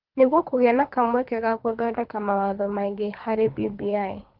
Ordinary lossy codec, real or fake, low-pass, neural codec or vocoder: Opus, 32 kbps; fake; 5.4 kHz; codec, 24 kHz, 3 kbps, HILCodec